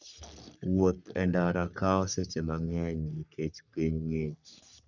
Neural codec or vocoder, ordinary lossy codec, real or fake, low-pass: codec, 44.1 kHz, 3.4 kbps, Pupu-Codec; none; fake; 7.2 kHz